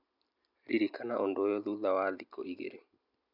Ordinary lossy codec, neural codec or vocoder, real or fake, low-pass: none; none; real; 5.4 kHz